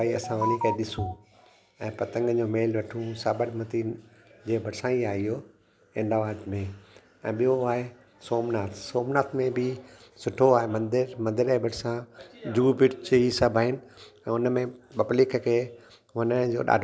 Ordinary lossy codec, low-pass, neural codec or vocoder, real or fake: none; none; none; real